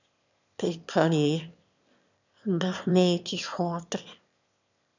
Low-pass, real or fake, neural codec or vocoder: 7.2 kHz; fake; autoencoder, 22.05 kHz, a latent of 192 numbers a frame, VITS, trained on one speaker